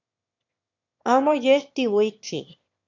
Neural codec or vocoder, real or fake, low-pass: autoencoder, 22.05 kHz, a latent of 192 numbers a frame, VITS, trained on one speaker; fake; 7.2 kHz